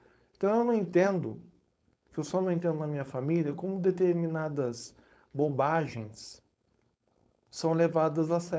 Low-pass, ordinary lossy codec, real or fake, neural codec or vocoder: none; none; fake; codec, 16 kHz, 4.8 kbps, FACodec